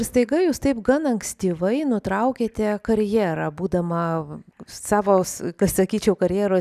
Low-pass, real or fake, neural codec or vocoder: 14.4 kHz; real; none